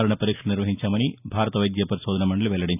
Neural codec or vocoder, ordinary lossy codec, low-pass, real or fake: none; none; 3.6 kHz; real